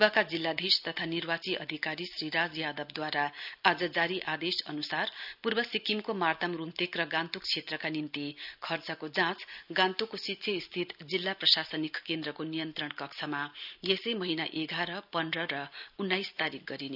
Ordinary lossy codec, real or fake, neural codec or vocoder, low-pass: none; real; none; 5.4 kHz